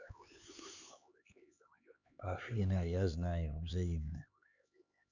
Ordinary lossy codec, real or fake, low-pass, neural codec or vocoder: none; fake; 7.2 kHz; codec, 16 kHz, 4 kbps, X-Codec, HuBERT features, trained on LibriSpeech